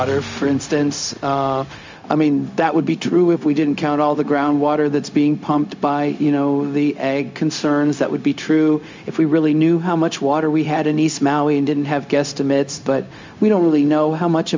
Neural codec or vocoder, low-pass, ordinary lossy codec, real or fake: codec, 16 kHz, 0.4 kbps, LongCat-Audio-Codec; 7.2 kHz; MP3, 64 kbps; fake